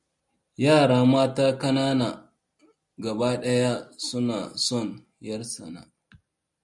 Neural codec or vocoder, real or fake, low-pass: none; real; 10.8 kHz